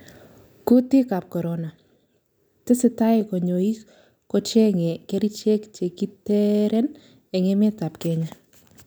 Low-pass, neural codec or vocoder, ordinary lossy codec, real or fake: none; none; none; real